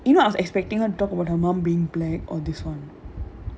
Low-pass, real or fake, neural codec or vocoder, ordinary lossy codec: none; real; none; none